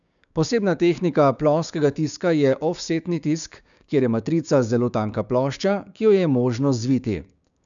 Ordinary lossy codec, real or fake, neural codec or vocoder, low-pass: none; fake; codec, 16 kHz, 6 kbps, DAC; 7.2 kHz